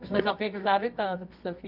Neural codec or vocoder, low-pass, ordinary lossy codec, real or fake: codec, 24 kHz, 0.9 kbps, WavTokenizer, medium music audio release; 5.4 kHz; none; fake